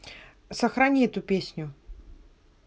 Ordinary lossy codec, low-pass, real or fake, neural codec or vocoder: none; none; real; none